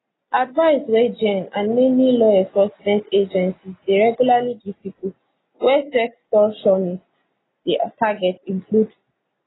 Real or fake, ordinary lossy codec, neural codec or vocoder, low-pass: real; AAC, 16 kbps; none; 7.2 kHz